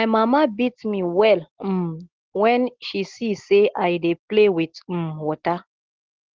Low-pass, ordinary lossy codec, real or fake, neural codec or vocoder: 7.2 kHz; Opus, 16 kbps; real; none